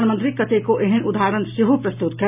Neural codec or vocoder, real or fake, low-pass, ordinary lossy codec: none; real; 3.6 kHz; none